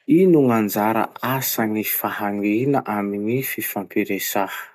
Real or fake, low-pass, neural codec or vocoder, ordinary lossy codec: real; 14.4 kHz; none; none